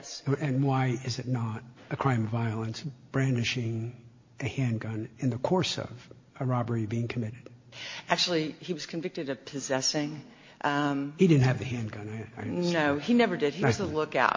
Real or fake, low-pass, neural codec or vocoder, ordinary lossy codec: real; 7.2 kHz; none; MP3, 32 kbps